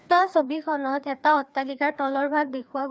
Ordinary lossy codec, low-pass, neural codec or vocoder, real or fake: none; none; codec, 16 kHz, 2 kbps, FreqCodec, larger model; fake